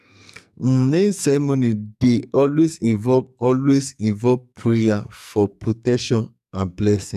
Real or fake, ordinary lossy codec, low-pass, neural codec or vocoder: fake; none; 14.4 kHz; codec, 44.1 kHz, 2.6 kbps, SNAC